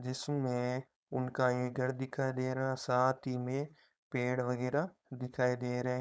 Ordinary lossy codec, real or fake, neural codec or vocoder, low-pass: none; fake; codec, 16 kHz, 4.8 kbps, FACodec; none